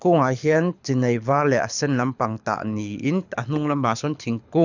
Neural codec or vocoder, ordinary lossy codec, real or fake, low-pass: codec, 24 kHz, 6 kbps, HILCodec; none; fake; 7.2 kHz